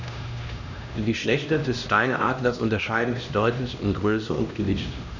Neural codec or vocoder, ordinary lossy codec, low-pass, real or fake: codec, 16 kHz, 1 kbps, X-Codec, HuBERT features, trained on LibriSpeech; none; 7.2 kHz; fake